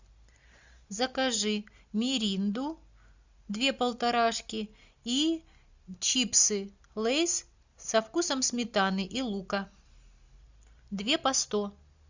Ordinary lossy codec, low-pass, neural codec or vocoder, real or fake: Opus, 64 kbps; 7.2 kHz; none; real